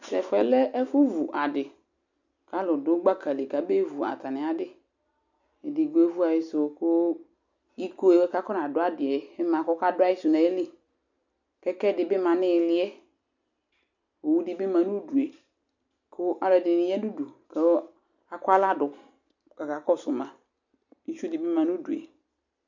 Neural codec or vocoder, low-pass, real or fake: none; 7.2 kHz; real